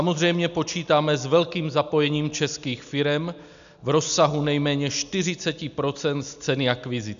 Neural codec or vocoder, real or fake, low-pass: none; real; 7.2 kHz